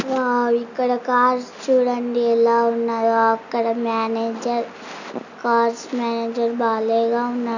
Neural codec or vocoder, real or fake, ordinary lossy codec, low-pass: none; real; none; 7.2 kHz